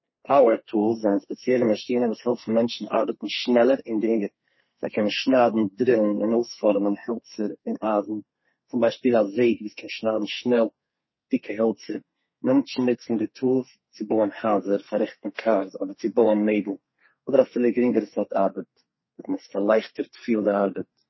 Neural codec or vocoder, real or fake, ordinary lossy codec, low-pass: codec, 32 kHz, 1.9 kbps, SNAC; fake; MP3, 24 kbps; 7.2 kHz